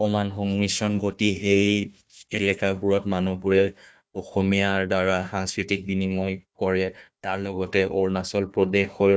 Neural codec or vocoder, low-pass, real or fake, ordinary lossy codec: codec, 16 kHz, 1 kbps, FunCodec, trained on Chinese and English, 50 frames a second; none; fake; none